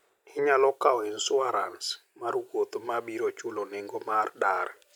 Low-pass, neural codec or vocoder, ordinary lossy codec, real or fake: 19.8 kHz; none; none; real